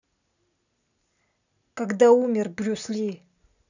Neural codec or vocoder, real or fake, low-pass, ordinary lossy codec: none; real; 7.2 kHz; none